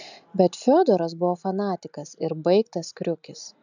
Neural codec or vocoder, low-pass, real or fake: none; 7.2 kHz; real